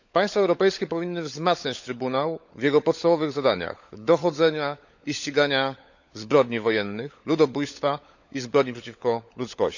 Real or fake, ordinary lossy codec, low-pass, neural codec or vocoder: fake; none; 7.2 kHz; codec, 16 kHz, 16 kbps, FunCodec, trained on LibriTTS, 50 frames a second